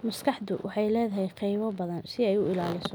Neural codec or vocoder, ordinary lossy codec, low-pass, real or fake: none; none; none; real